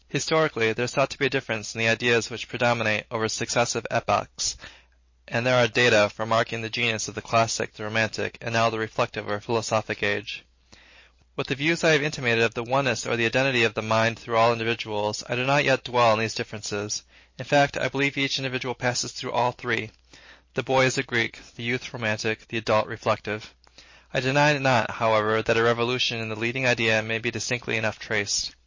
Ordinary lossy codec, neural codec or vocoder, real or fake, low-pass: MP3, 32 kbps; none; real; 7.2 kHz